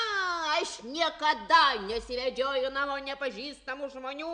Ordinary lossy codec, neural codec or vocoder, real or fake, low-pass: MP3, 96 kbps; none; real; 9.9 kHz